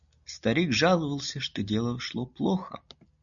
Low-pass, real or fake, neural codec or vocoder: 7.2 kHz; real; none